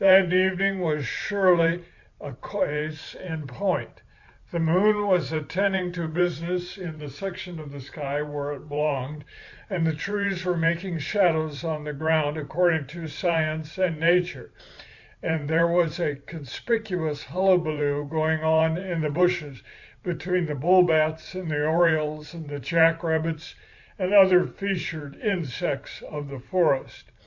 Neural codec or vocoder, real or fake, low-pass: vocoder, 44.1 kHz, 128 mel bands every 512 samples, BigVGAN v2; fake; 7.2 kHz